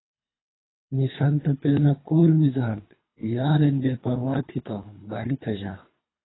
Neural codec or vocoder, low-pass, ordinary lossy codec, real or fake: codec, 24 kHz, 3 kbps, HILCodec; 7.2 kHz; AAC, 16 kbps; fake